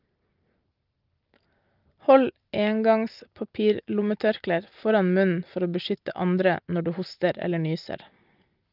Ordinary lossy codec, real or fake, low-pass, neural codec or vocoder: Opus, 24 kbps; real; 5.4 kHz; none